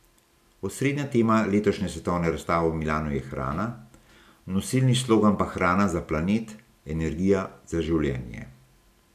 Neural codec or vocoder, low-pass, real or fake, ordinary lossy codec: none; 14.4 kHz; real; none